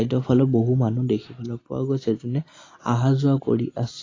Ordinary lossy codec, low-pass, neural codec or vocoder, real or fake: AAC, 32 kbps; 7.2 kHz; none; real